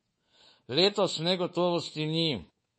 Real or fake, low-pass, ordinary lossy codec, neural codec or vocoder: fake; 10.8 kHz; MP3, 32 kbps; codec, 24 kHz, 3.1 kbps, DualCodec